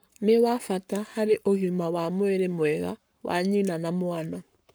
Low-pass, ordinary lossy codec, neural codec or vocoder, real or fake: none; none; codec, 44.1 kHz, 7.8 kbps, Pupu-Codec; fake